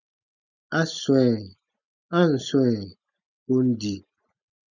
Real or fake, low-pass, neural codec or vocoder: real; 7.2 kHz; none